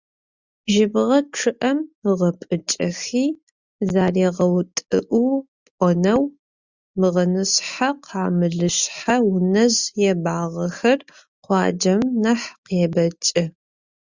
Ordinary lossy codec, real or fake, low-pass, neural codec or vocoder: Opus, 64 kbps; real; 7.2 kHz; none